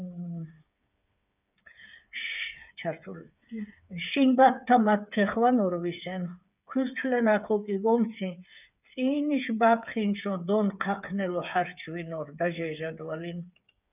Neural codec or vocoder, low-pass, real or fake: codec, 16 kHz, 8 kbps, FreqCodec, smaller model; 3.6 kHz; fake